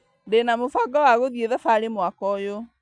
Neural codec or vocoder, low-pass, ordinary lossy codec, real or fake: none; 9.9 kHz; none; real